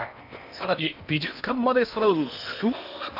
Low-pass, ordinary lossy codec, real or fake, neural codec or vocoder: 5.4 kHz; none; fake; codec, 16 kHz in and 24 kHz out, 0.8 kbps, FocalCodec, streaming, 65536 codes